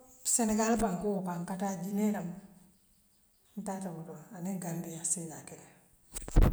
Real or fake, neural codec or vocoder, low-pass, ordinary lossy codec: real; none; none; none